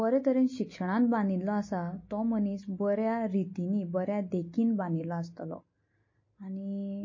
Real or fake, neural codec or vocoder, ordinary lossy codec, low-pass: real; none; MP3, 32 kbps; 7.2 kHz